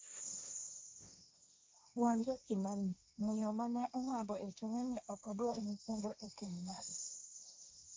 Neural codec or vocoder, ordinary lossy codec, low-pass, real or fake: codec, 16 kHz, 1.1 kbps, Voila-Tokenizer; none; none; fake